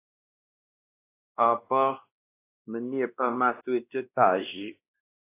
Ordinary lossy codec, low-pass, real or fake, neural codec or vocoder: AAC, 24 kbps; 3.6 kHz; fake; codec, 16 kHz, 1 kbps, X-Codec, WavLM features, trained on Multilingual LibriSpeech